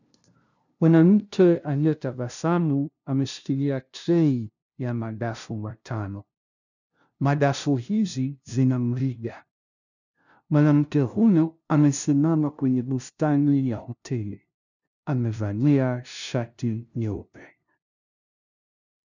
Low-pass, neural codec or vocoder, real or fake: 7.2 kHz; codec, 16 kHz, 0.5 kbps, FunCodec, trained on LibriTTS, 25 frames a second; fake